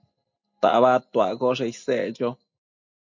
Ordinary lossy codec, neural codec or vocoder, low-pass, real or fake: MP3, 48 kbps; none; 7.2 kHz; real